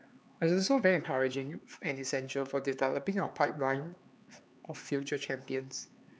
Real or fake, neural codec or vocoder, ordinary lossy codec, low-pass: fake; codec, 16 kHz, 4 kbps, X-Codec, HuBERT features, trained on LibriSpeech; none; none